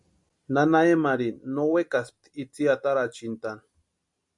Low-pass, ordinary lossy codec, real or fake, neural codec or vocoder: 10.8 kHz; MP3, 64 kbps; real; none